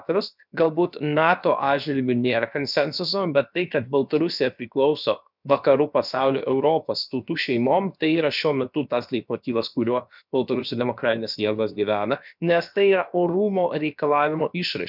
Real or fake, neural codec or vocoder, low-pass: fake; codec, 16 kHz, about 1 kbps, DyCAST, with the encoder's durations; 5.4 kHz